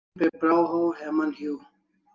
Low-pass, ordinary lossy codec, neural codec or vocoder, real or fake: 7.2 kHz; Opus, 24 kbps; none; real